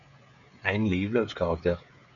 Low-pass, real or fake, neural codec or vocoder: 7.2 kHz; fake; codec, 16 kHz, 16 kbps, FreqCodec, smaller model